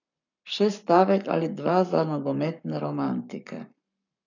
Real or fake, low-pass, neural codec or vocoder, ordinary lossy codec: fake; 7.2 kHz; codec, 44.1 kHz, 7.8 kbps, Pupu-Codec; none